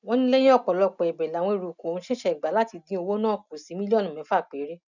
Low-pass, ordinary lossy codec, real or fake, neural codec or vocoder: 7.2 kHz; none; real; none